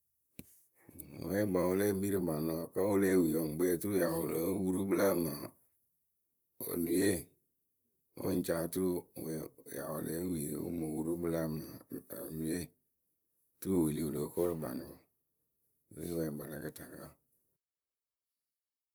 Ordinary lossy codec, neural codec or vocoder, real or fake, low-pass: none; vocoder, 44.1 kHz, 128 mel bands, Pupu-Vocoder; fake; none